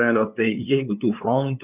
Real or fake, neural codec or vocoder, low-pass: fake; codec, 16 kHz, 2 kbps, FunCodec, trained on LibriTTS, 25 frames a second; 3.6 kHz